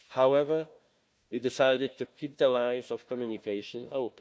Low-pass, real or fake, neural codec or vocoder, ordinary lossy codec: none; fake; codec, 16 kHz, 1 kbps, FunCodec, trained on Chinese and English, 50 frames a second; none